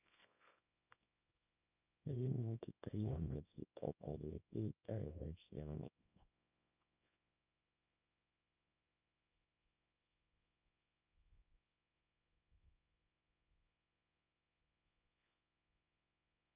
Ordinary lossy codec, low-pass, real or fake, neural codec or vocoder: none; 3.6 kHz; fake; codec, 24 kHz, 0.9 kbps, WavTokenizer, small release